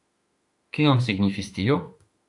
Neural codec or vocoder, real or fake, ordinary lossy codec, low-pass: autoencoder, 48 kHz, 32 numbers a frame, DAC-VAE, trained on Japanese speech; fake; AAC, 64 kbps; 10.8 kHz